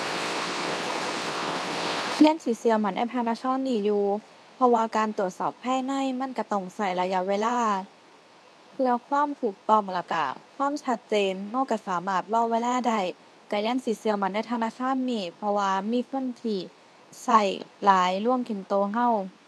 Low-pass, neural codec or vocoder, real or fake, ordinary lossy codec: none; codec, 24 kHz, 0.9 kbps, WavTokenizer, medium speech release version 2; fake; none